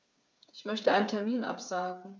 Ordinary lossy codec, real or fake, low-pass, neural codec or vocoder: none; fake; none; codec, 16 kHz, 8 kbps, FreqCodec, smaller model